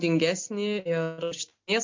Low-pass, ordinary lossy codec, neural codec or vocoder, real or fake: 7.2 kHz; MP3, 48 kbps; none; real